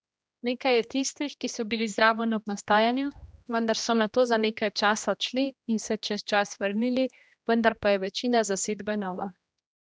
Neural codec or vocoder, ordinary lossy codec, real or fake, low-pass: codec, 16 kHz, 1 kbps, X-Codec, HuBERT features, trained on general audio; none; fake; none